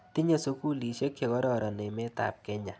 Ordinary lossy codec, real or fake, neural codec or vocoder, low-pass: none; real; none; none